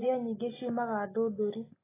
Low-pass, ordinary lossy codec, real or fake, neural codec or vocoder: 3.6 kHz; AAC, 16 kbps; real; none